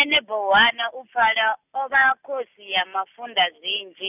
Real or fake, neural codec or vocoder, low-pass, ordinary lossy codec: real; none; 3.6 kHz; none